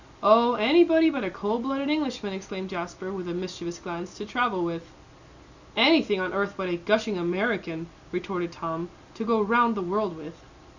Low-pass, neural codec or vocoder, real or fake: 7.2 kHz; none; real